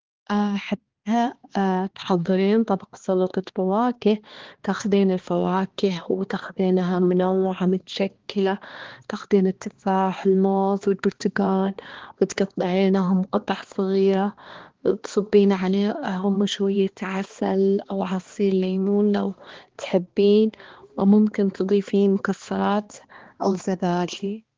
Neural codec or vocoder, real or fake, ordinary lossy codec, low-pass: codec, 16 kHz, 2 kbps, X-Codec, HuBERT features, trained on balanced general audio; fake; Opus, 16 kbps; 7.2 kHz